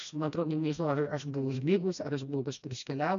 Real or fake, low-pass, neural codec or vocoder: fake; 7.2 kHz; codec, 16 kHz, 1 kbps, FreqCodec, smaller model